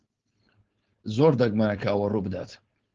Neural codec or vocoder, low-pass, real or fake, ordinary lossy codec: codec, 16 kHz, 4.8 kbps, FACodec; 7.2 kHz; fake; Opus, 16 kbps